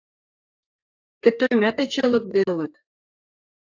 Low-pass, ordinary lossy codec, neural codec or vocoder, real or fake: 7.2 kHz; AAC, 48 kbps; codec, 32 kHz, 1.9 kbps, SNAC; fake